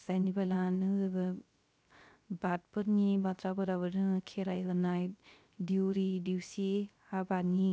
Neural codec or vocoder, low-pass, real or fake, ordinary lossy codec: codec, 16 kHz, 0.3 kbps, FocalCodec; none; fake; none